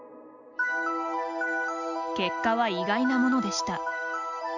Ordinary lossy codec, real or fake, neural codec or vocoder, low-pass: none; real; none; 7.2 kHz